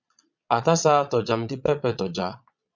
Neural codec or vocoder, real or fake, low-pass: vocoder, 22.05 kHz, 80 mel bands, Vocos; fake; 7.2 kHz